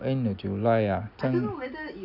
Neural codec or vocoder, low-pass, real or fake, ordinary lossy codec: none; 5.4 kHz; real; none